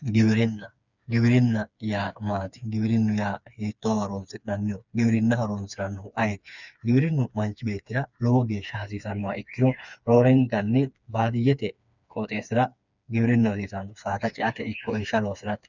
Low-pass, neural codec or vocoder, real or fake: 7.2 kHz; codec, 16 kHz, 4 kbps, FreqCodec, smaller model; fake